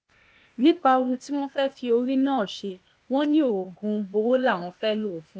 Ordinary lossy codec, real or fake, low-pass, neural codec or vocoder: none; fake; none; codec, 16 kHz, 0.8 kbps, ZipCodec